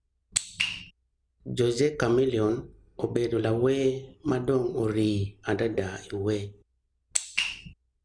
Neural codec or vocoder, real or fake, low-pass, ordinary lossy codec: none; real; 9.9 kHz; none